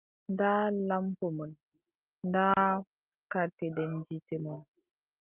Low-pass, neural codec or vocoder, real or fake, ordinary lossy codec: 3.6 kHz; none; real; Opus, 24 kbps